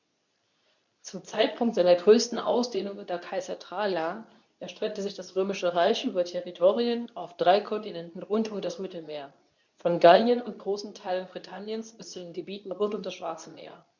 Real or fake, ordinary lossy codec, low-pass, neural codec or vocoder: fake; none; 7.2 kHz; codec, 24 kHz, 0.9 kbps, WavTokenizer, medium speech release version 2